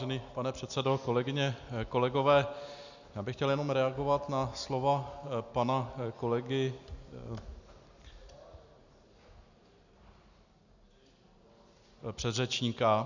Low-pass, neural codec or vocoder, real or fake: 7.2 kHz; none; real